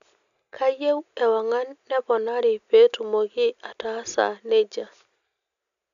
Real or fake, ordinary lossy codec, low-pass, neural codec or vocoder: real; none; 7.2 kHz; none